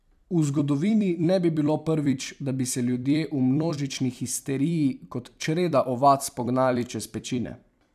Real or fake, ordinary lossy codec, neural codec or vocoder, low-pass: fake; none; vocoder, 44.1 kHz, 128 mel bands every 256 samples, BigVGAN v2; 14.4 kHz